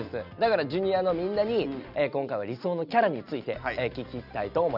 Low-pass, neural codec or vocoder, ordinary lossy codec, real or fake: 5.4 kHz; none; none; real